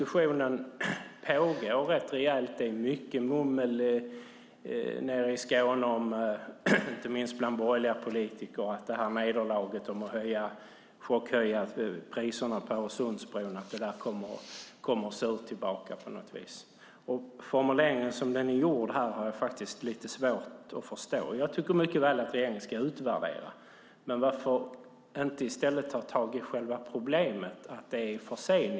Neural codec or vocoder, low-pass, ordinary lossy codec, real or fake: none; none; none; real